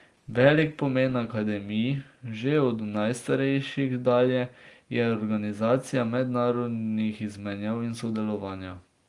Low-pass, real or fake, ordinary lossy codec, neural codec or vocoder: 10.8 kHz; real; Opus, 24 kbps; none